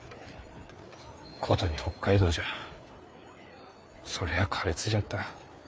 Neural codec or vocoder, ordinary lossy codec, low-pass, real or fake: codec, 16 kHz, 4 kbps, FreqCodec, larger model; none; none; fake